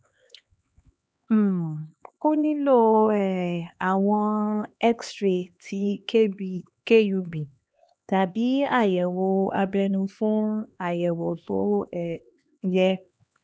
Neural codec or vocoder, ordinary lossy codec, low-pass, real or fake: codec, 16 kHz, 2 kbps, X-Codec, HuBERT features, trained on LibriSpeech; none; none; fake